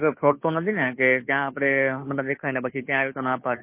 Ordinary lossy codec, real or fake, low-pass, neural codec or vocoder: MP3, 24 kbps; fake; 3.6 kHz; codec, 16 kHz, 2 kbps, FunCodec, trained on Chinese and English, 25 frames a second